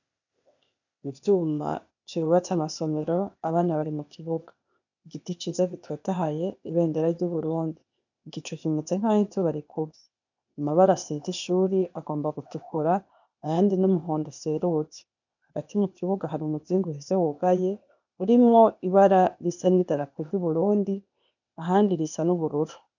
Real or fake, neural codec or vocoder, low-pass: fake; codec, 16 kHz, 0.8 kbps, ZipCodec; 7.2 kHz